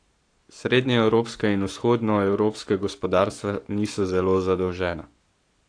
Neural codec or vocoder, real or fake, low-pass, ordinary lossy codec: codec, 44.1 kHz, 7.8 kbps, Pupu-Codec; fake; 9.9 kHz; AAC, 48 kbps